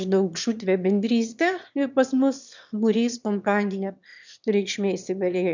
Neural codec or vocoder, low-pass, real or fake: autoencoder, 22.05 kHz, a latent of 192 numbers a frame, VITS, trained on one speaker; 7.2 kHz; fake